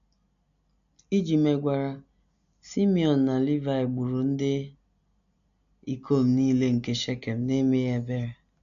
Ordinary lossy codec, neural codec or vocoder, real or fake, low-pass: MP3, 96 kbps; none; real; 7.2 kHz